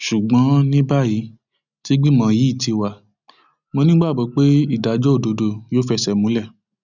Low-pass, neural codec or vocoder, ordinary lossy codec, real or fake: 7.2 kHz; none; none; real